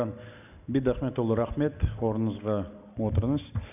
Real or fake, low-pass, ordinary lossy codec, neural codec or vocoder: real; 3.6 kHz; none; none